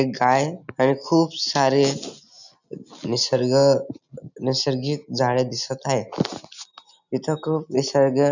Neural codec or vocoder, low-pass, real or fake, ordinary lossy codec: none; 7.2 kHz; real; none